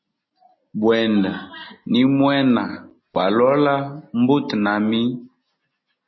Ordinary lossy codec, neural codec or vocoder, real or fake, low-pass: MP3, 24 kbps; none; real; 7.2 kHz